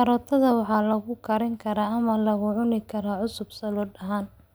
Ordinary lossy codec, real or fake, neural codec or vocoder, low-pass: none; real; none; none